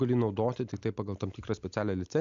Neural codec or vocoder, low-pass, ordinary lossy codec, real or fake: none; 7.2 kHz; MP3, 64 kbps; real